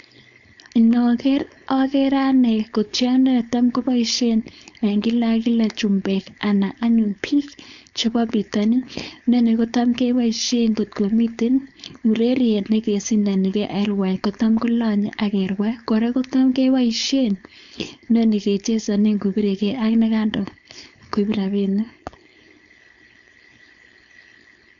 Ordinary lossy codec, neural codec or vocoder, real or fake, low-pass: MP3, 96 kbps; codec, 16 kHz, 4.8 kbps, FACodec; fake; 7.2 kHz